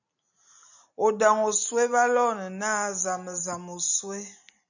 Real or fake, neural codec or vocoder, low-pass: real; none; 7.2 kHz